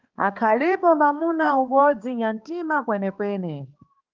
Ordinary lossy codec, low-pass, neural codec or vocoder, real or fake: Opus, 32 kbps; 7.2 kHz; codec, 16 kHz, 2 kbps, X-Codec, HuBERT features, trained on balanced general audio; fake